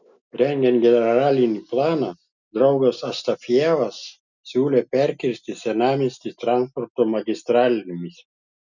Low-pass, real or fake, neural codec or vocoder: 7.2 kHz; real; none